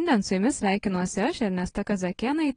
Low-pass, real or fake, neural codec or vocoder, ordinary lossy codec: 9.9 kHz; fake; vocoder, 22.05 kHz, 80 mel bands, WaveNeXt; AAC, 32 kbps